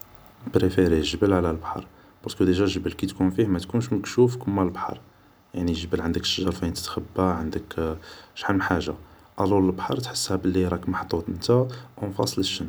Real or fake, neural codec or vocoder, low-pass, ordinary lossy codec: real; none; none; none